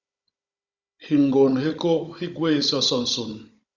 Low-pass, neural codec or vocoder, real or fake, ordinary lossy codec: 7.2 kHz; codec, 16 kHz, 16 kbps, FunCodec, trained on Chinese and English, 50 frames a second; fake; Opus, 64 kbps